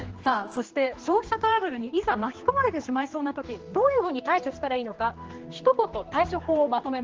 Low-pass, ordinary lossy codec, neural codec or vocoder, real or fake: 7.2 kHz; Opus, 16 kbps; codec, 16 kHz, 2 kbps, X-Codec, HuBERT features, trained on general audio; fake